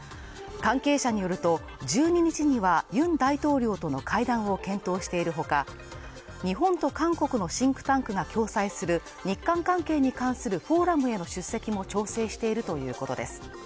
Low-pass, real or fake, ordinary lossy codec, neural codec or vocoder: none; real; none; none